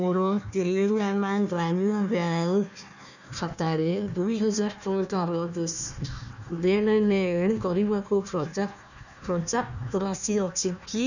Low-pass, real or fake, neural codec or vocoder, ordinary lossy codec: 7.2 kHz; fake; codec, 16 kHz, 1 kbps, FunCodec, trained on Chinese and English, 50 frames a second; none